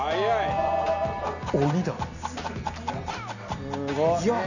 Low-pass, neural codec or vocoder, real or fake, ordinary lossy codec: 7.2 kHz; none; real; none